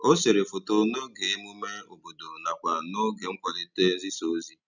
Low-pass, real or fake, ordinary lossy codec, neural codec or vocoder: 7.2 kHz; real; none; none